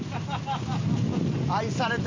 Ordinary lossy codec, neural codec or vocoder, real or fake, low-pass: MP3, 64 kbps; none; real; 7.2 kHz